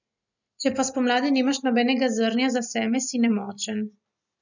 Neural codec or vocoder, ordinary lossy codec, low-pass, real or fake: none; none; 7.2 kHz; real